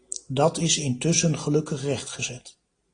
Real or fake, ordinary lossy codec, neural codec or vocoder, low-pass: real; AAC, 32 kbps; none; 9.9 kHz